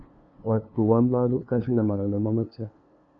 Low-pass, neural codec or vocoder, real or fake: 7.2 kHz; codec, 16 kHz, 2 kbps, FunCodec, trained on LibriTTS, 25 frames a second; fake